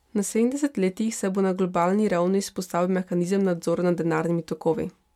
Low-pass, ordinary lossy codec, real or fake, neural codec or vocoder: 19.8 kHz; MP3, 96 kbps; real; none